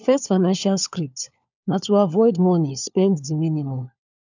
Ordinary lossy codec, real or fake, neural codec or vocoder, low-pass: none; fake; codec, 16 kHz, 4 kbps, FunCodec, trained on LibriTTS, 50 frames a second; 7.2 kHz